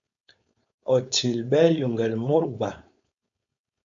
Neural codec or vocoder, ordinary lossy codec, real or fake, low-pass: codec, 16 kHz, 4.8 kbps, FACodec; AAC, 48 kbps; fake; 7.2 kHz